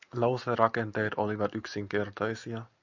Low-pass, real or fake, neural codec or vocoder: 7.2 kHz; real; none